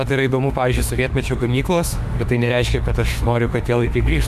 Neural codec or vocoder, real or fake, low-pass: autoencoder, 48 kHz, 32 numbers a frame, DAC-VAE, trained on Japanese speech; fake; 14.4 kHz